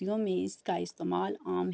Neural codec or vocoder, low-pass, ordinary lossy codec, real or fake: none; none; none; real